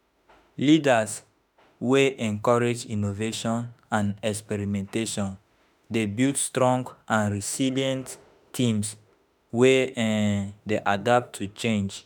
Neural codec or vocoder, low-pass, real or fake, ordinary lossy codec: autoencoder, 48 kHz, 32 numbers a frame, DAC-VAE, trained on Japanese speech; none; fake; none